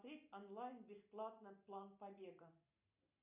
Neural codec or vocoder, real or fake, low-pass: none; real; 3.6 kHz